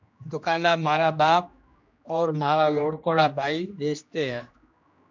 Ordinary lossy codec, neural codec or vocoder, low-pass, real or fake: MP3, 64 kbps; codec, 16 kHz, 1 kbps, X-Codec, HuBERT features, trained on general audio; 7.2 kHz; fake